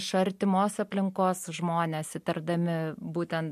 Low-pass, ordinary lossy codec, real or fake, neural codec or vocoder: 14.4 kHz; MP3, 64 kbps; real; none